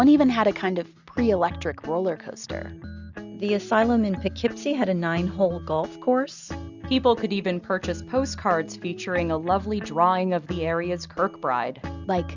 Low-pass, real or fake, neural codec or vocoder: 7.2 kHz; real; none